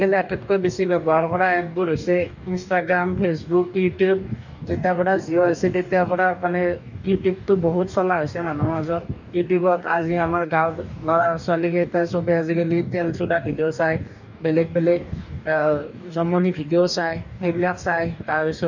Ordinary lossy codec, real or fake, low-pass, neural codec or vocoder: none; fake; 7.2 kHz; codec, 44.1 kHz, 2.6 kbps, DAC